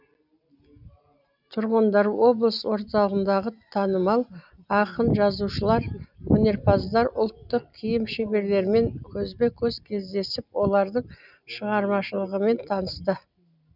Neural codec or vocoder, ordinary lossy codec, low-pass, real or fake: none; none; 5.4 kHz; real